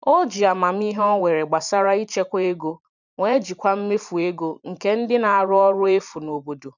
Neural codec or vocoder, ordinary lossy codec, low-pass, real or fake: vocoder, 44.1 kHz, 128 mel bands every 512 samples, BigVGAN v2; none; 7.2 kHz; fake